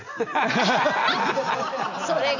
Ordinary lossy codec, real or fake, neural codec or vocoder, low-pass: none; real; none; 7.2 kHz